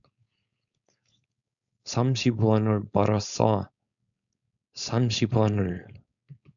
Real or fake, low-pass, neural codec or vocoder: fake; 7.2 kHz; codec, 16 kHz, 4.8 kbps, FACodec